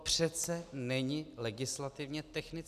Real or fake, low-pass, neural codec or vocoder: real; 14.4 kHz; none